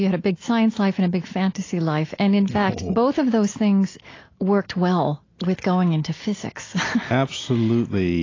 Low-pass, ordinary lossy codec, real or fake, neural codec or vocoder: 7.2 kHz; AAC, 32 kbps; real; none